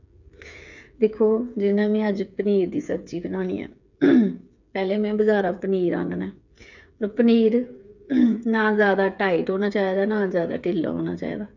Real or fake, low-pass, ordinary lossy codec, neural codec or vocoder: fake; 7.2 kHz; none; codec, 16 kHz, 8 kbps, FreqCodec, smaller model